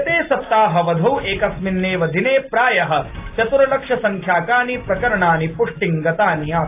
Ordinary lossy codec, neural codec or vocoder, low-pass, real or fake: AAC, 24 kbps; none; 3.6 kHz; real